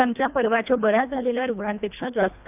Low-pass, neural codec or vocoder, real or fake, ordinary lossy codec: 3.6 kHz; codec, 24 kHz, 1.5 kbps, HILCodec; fake; none